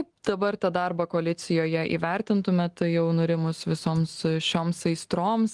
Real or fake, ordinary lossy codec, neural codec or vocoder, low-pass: real; Opus, 24 kbps; none; 10.8 kHz